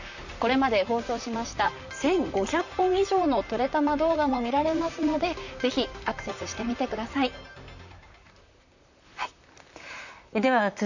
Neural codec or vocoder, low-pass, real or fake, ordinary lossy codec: vocoder, 44.1 kHz, 128 mel bands, Pupu-Vocoder; 7.2 kHz; fake; none